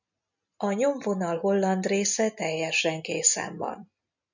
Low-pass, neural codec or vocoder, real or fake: 7.2 kHz; none; real